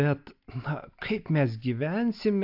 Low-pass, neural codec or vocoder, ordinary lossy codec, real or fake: 5.4 kHz; none; AAC, 48 kbps; real